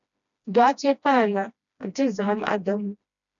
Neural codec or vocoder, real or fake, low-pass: codec, 16 kHz, 1 kbps, FreqCodec, smaller model; fake; 7.2 kHz